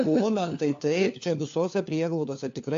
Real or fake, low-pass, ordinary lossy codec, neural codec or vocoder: fake; 7.2 kHz; AAC, 48 kbps; codec, 16 kHz, 4 kbps, FunCodec, trained on LibriTTS, 50 frames a second